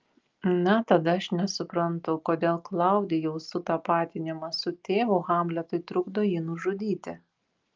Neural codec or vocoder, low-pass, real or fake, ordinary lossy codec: none; 7.2 kHz; real; Opus, 32 kbps